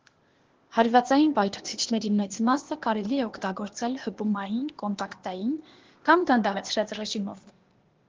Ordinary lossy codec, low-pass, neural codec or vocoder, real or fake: Opus, 16 kbps; 7.2 kHz; codec, 16 kHz, 0.8 kbps, ZipCodec; fake